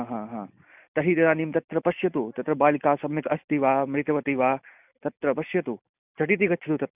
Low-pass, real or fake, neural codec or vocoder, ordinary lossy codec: 3.6 kHz; real; none; none